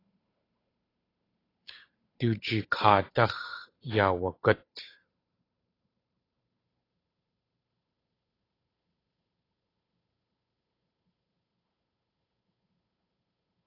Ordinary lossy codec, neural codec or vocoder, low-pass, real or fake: AAC, 24 kbps; codec, 16 kHz, 8 kbps, FunCodec, trained on Chinese and English, 25 frames a second; 5.4 kHz; fake